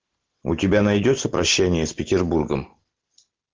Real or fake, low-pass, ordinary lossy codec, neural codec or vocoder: real; 7.2 kHz; Opus, 16 kbps; none